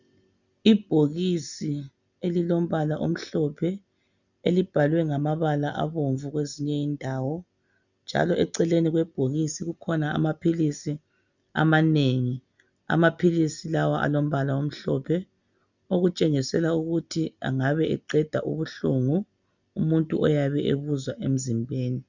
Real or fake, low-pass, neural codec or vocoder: real; 7.2 kHz; none